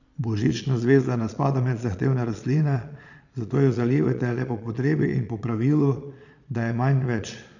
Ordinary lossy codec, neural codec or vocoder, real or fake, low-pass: none; vocoder, 22.05 kHz, 80 mel bands, Vocos; fake; 7.2 kHz